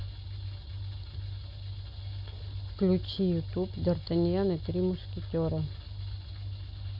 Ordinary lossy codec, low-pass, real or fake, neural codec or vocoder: none; 5.4 kHz; real; none